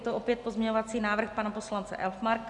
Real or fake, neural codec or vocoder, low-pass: real; none; 10.8 kHz